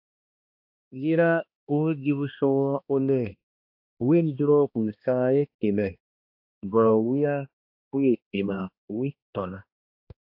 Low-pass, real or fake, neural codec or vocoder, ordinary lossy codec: 5.4 kHz; fake; codec, 16 kHz, 1 kbps, X-Codec, HuBERT features, trained on balanced general audio; AAC, 48 kbps